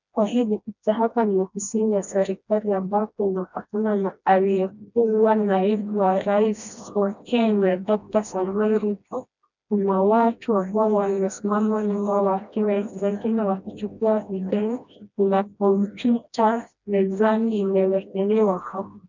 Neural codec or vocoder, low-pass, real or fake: codec, 16 kHz, 1 kbps, FreqCodec, smaller model; 7.2 kHz; fake